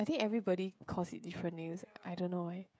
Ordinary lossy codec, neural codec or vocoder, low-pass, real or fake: none; codec, 16 kHz, 16 kbps, FreqCodec, smaller model; none; fake